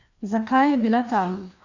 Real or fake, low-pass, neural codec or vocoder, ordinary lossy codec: fake; 7.2 kHz; codec, 16 kHz, 2 kbps, FreqCodec, larger model; none